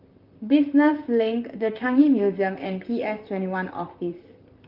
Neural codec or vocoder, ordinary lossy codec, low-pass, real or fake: vocoder, 44.1 kHz, 128 mel bands, Pupu-Vocoder; Opus, 24 kbps; 5.4 kHz; fake